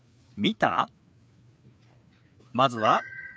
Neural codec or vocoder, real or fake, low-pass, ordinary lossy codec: codec, 16 kHz, 4 kbps, FreqCodec, larger model; fake; none; none